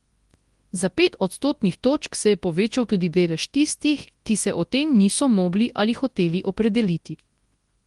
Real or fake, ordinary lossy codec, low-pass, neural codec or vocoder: fake; Opus, 24 kbps; 10.8 kHz; codec, 24 kHz, 0.9 kbps, WavTokenizer, large speech release